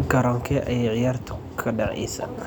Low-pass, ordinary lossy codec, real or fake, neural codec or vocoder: 19.8 kHz; none; real; none